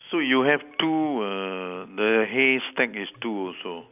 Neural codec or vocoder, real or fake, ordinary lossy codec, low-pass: none; real; none; 3.6 kHz